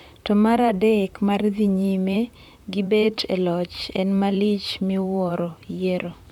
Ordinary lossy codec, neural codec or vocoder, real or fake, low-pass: none; vocoder, 44.1 kHz, 128 mel bands, Pupu-Vocoder; fake; 19.8 kHz